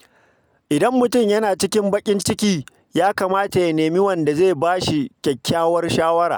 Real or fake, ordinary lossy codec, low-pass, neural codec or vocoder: real; none; none; none